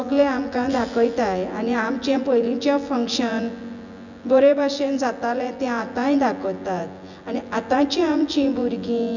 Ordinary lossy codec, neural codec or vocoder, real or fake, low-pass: none; vocoder, 24 kHz, 100 mel bands, Vocos; fake; 7.2 kHz